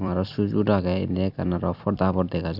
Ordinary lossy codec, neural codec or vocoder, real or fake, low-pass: none; vocoder, 44.1 kHz, 128 mel bands every 256 samples, BigVGAN v2; fake; 5.4 kHz